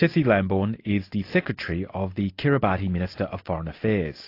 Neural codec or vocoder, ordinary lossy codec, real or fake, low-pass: none; AAC, 32 kbps; real; 5.4 kHz